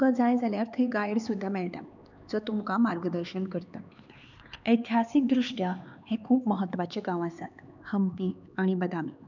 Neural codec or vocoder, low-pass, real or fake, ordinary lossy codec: codec, 16 kHz, 4 kbps, X-Codec, HuBERT features, trained on LibriSpeech; 7.2 kHz; fake; none